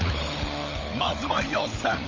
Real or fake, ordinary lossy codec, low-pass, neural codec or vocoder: fake; MP3, 32 kbps; 7.2 kHz; codec, 16 kHz, 16 kbps, FunCodec, trained on LibriTTS, 50 frames a second